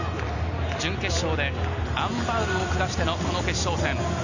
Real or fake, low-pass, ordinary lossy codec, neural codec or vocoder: real; 7.2 kHz; none; none